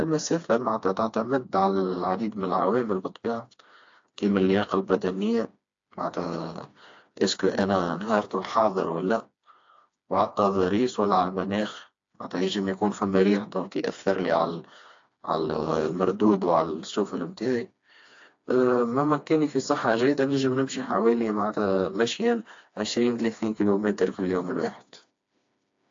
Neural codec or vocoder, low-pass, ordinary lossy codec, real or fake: codec, 16 kHz, 2 kbps, FreqCodec, smaller model; 7.2 kHz; AAC, 48 kbps; fake